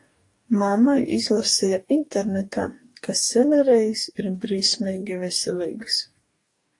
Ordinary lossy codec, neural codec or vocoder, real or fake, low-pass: AAC, 48 kbps; codec, 44.1 kHz, 2.6 kbps, DAC; fake; 10.8 kHz